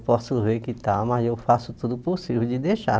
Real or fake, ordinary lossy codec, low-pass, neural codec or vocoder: real; none; none; none